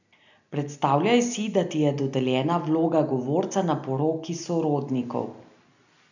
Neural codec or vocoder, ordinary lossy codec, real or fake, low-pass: none; none; real; 7.2 kHz